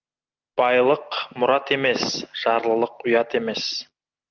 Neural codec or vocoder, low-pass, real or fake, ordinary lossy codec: none; 7.2 kHz; real; Opus, 32 kbps